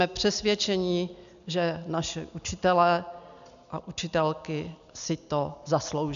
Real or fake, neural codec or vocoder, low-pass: real; none; 7.2 kHz